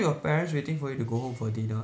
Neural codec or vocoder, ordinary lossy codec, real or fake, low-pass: none; none; real; none